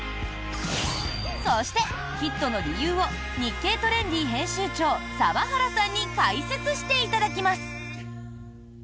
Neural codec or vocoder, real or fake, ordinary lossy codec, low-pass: none; real; none; none